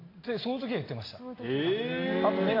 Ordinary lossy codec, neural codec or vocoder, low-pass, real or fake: AAC, 24 kbps; none; 5.4 kHz; real